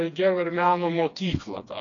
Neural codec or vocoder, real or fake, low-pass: codec, 16 kHz, 2 kbps, FreqCodec, smaller model; fake; 7.2 kHz